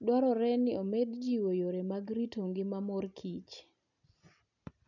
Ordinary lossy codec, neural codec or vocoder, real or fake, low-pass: none; none; real; 7.2 kHz